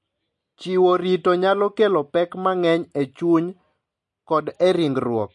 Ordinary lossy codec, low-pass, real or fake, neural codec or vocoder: MP3, 48 kbps; 10.8 kHz; real; none